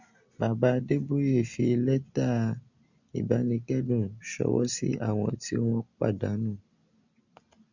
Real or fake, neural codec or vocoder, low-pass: real; none; 7.2 kHz